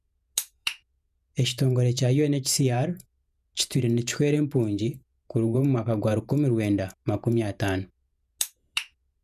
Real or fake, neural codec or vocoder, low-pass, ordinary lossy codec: fake; vocoder, 48 kHz, 128 mel bands, Vocos; 14.4 kHz; none